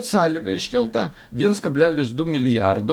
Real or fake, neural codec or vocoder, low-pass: fake; codec, 44.1 kHz, 2.6 kbps, DAC; 19.8 kHz